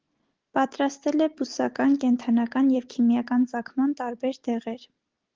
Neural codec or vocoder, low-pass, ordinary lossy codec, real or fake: none; 7.2 kHz; Opus, 16 kbps; real